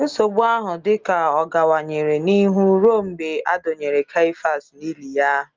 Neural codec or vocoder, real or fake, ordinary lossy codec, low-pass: none; real; Opus, 24 kbps; 7.2 kHz